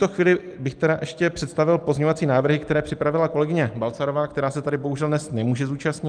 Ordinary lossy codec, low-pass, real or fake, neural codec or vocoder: Opus, 32 kbps; 9.9 kHz; real; none